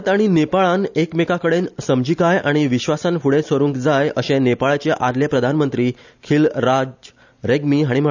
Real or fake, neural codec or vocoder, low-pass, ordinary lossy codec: real; none; 7.2 kHz; none